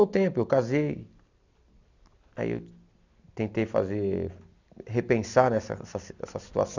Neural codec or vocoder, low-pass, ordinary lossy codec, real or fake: none; 7.2 kHz; none; real